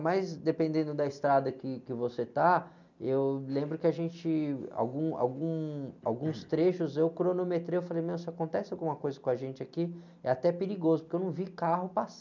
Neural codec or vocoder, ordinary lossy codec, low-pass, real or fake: none; none; 7.2 kHz; real